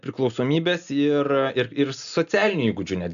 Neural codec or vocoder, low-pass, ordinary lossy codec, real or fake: none; 7.2 kHz; AAC, 96 kbps; real